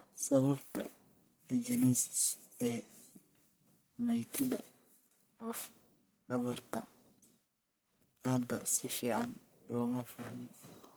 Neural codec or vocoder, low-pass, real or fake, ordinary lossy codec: codec, 44.1 kHz, 1.7 kbps, Pupu-Codec; none; fake; none